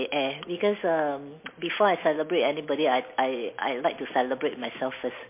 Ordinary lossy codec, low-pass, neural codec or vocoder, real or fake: MP3, 24 kbps; 3.6 kHz; none; real